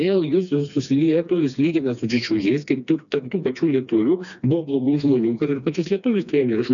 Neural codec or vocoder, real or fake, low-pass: codec, 16 kHz, 2 kbps, FreqCodec, smaller model; fake; 7.2 kHz